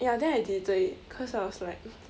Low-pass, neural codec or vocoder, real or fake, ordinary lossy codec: none; none; real; none